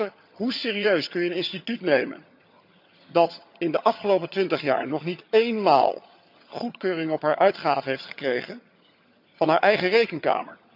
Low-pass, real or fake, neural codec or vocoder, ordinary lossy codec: 5.4 kHz; fake; vocoder, 22.05 kHz, 80 mel bands, HiFi-GAN; none